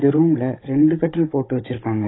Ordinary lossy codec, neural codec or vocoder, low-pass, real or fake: AAC, 16 kbps; codec, 16 kHz, 16 kbps, FunCodec, trained on Chinese and English, 50 frames a second; 7.2 kHz; fake